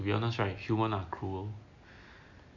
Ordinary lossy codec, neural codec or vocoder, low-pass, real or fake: AAC, 48 kbps; none; 7.2 kHz; real